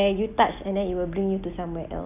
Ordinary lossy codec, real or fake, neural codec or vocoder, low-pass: none; real; none; 3.6 kHz